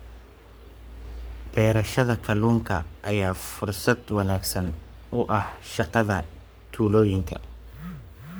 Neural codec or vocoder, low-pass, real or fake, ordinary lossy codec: codec, 44.1 kHz, 3.4 kbps, Pupu-Codec; none; fake; none